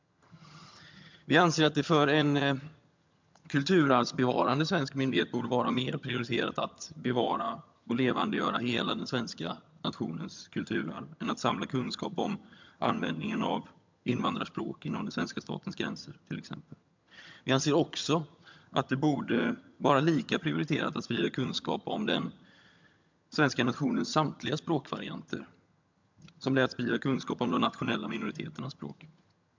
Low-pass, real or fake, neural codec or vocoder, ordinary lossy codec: 7.2 kHz; fake; vocoder, 22.05 kHz, 80 mel bands, HiFi-GAN; MP3, 64 kbps